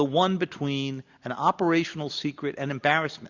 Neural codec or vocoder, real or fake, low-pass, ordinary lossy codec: none; real; 7.2 kHz; Opus, 64 kbps